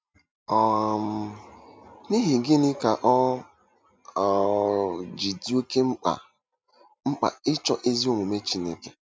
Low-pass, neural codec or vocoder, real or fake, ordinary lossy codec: none; none; real; none